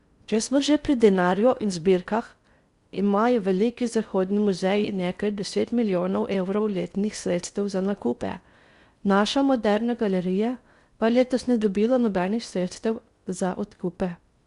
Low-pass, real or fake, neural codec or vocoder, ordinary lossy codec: 10.8 kHz; fake; codec, 16 kHz in and 24 kHz out, 0.6 kbps, FocalCodec, streaming, 4096 codes; Opus, 64 kbps